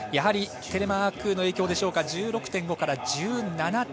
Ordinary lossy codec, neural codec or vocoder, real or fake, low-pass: none; none; real; none